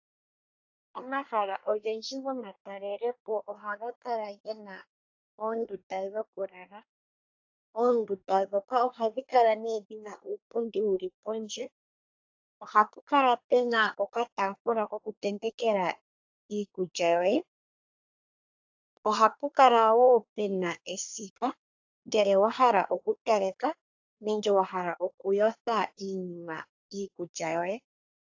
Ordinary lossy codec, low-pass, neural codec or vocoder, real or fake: AAC, 48 kbps; 7.2 kHz; codec, 24 kHz, 1 kbps, SNAC; fake